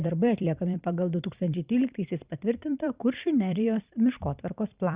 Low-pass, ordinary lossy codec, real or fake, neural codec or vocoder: 3.6 kHz; Opus, 64 kbps; fake; vocoder, 44.1 kHz, 128 mel bands every 256 samples, BigVGAN v2